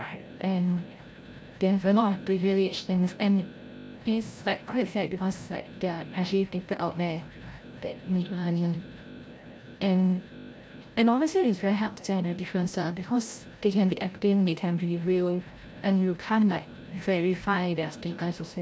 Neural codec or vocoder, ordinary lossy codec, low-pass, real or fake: codec, 16 kHz, 0.5 kbps, FreqCodec, larger model; none; none; fake